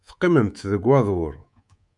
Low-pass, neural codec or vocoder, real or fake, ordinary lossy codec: 10.8 kHz; codec, 24 kHz, 3.1 kbps, DualCodec; fake; MP3, 64 kbps